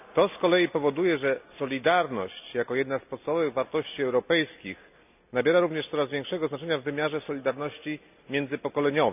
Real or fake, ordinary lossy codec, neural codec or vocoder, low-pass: real; none; none; 3.6 kHz